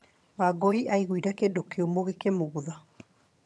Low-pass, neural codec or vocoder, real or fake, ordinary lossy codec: none; vocoder, 22.05 kHz, 80 mel bands, HiFi-GAN; fake; none